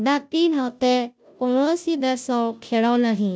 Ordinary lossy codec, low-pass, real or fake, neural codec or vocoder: none; none; fake; codec, 16 kHz, 0.5 kbps, FunCodec, trained on Chinese and English, 25 frames a second